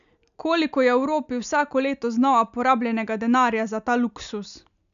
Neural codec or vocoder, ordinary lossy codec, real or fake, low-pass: none; none; real; 7.2 kHz